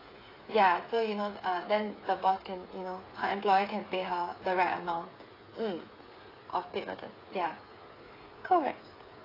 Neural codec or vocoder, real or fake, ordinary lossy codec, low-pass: codec, 16 kHz, 8 kbps, FreqCodec, smaller model; fake; AAC, 24 kbps; 5.4 kHz